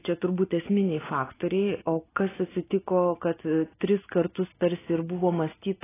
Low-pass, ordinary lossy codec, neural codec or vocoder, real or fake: 3.6 kHz; AAC, 16 kbps; none; real